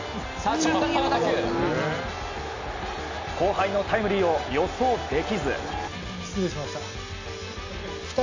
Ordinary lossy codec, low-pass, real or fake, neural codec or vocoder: AAC, 48 kbps; 7.2 kHz; real; none